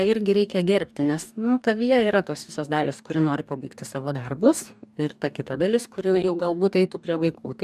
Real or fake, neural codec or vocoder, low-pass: fake; codec, 44.1 kHz, 2.6 kbps, DAC; 14.4 kHz